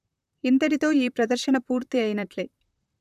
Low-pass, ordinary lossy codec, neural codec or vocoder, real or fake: 14.4 kHz; none; vocoder, 44.1 kHz, 128 mel bands, Pupu-Vocoder; fake